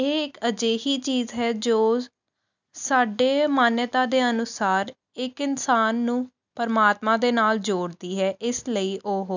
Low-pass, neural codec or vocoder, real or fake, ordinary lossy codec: 7.2 kHz; none; real; none